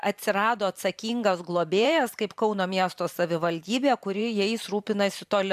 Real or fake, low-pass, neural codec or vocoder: fake; 14.4 kHz; vocoder, 44.1 kHz, 128 mel bands every 512 samples, BigVGAN v2